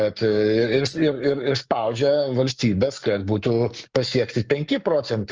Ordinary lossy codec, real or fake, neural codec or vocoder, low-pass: Opus, 24 kbps; fake; codec, 44.1 kHz, 7.8 kbps, Pupu-Codec; 7.2 kHz